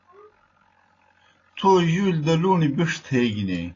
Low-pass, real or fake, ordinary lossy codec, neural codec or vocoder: 7.2 kHz; real; AAC, 32 kbps; none